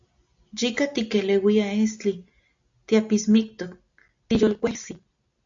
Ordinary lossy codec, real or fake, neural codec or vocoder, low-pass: AAC, 64 kbps; real; none; 7.2 kHz